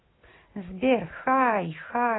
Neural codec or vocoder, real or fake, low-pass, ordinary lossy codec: none; real; 7.2 kHz; AAC, 16 kbps